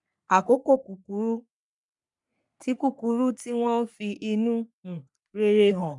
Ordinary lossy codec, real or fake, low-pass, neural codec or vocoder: none; fake; 10.8 kHz; codec, 44.1 kHz, 3.4 kbps, Pupu-Codec